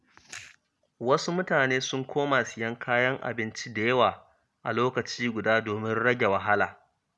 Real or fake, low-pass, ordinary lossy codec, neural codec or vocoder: real; none; none; none